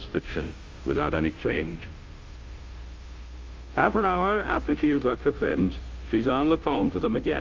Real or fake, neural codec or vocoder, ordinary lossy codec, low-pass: fake; codec, 16 kHz, 0.5 kbps, FunCodec, trained on Chinese and English, 25 frames a second; Opus, 32 kbps; 7.2 kHz